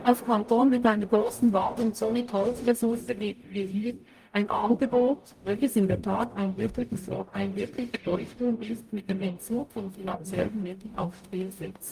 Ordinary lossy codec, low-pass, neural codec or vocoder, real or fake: Opus, 32 kbps; 14.4 kHz; codec, 44.1 kHz, 0.9 kbps, DAC; fake